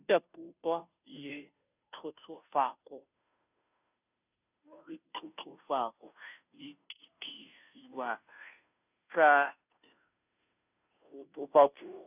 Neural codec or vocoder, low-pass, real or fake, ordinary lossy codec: codec, 16 kHz, 0.5 kbps, FunCodec, trained on Chinese and English, 25 frames a second; 3.6 kHz; fake; none